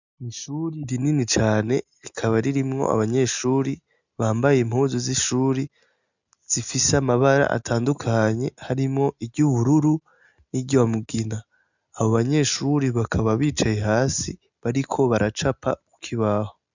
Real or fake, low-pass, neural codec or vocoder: real; 7.2 kHz; none